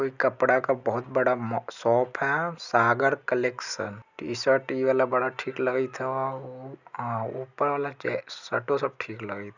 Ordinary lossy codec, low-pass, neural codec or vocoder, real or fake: none; 7.2 kHz; none; real